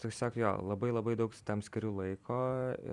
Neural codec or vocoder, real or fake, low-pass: vocoder, 44.1 kHz, 128 mel bands every 256 samples, BigVGAN v2; fake; 10.8 kHz